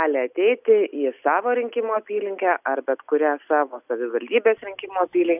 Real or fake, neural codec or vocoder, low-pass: real; none; 3.6 kHz